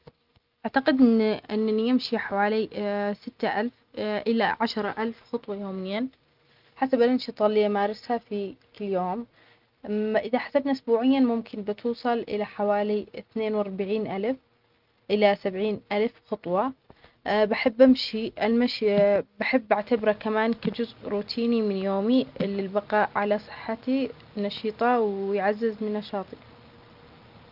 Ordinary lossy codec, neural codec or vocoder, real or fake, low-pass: Opus, 24 kbps; none; real; 5.4 kHz